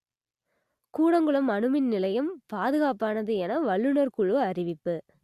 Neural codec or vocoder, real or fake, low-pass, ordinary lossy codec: none; real; 14.4 kHz; Opus, 64 kbps